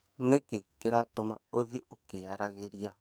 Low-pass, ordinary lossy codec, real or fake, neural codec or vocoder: none; none; fake; codec, 44.1 kHz, 2.6 kbps, SNAC